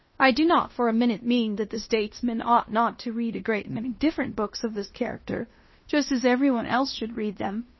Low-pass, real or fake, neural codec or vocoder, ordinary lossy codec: 7.2 kHz; fake; codec, 16 kHz in and 24 kHz out, 0.9 kbps, LongCat-Audio-Codec, fine tuned four codebook decoder; MP3, 24 kbps